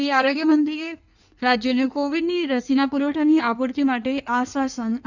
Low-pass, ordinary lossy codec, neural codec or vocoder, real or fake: 7.2 kHz; none; codec, 16 kHz in and 24 kHz out, 1.1 kbps, FireRedTTS-2 codec; fake